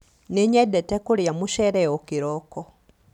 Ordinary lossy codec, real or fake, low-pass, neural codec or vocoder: none; real; 19.8 kHz; none